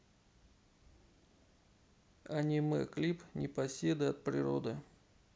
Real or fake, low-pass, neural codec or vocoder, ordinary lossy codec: real; none; none; none